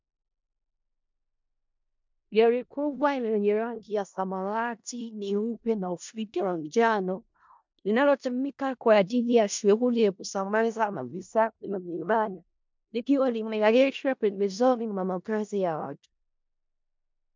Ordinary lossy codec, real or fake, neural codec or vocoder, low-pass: MP3, 64 kbps; fake; codec, 16 kHz in and 24 kHz out, 0.4 kbps, LongCat-Audio-Codec, four codebook decoder; 7.2 kHz